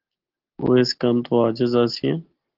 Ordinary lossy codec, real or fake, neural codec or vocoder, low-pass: Opus, 16 kbps; real; none; 5.4 kHz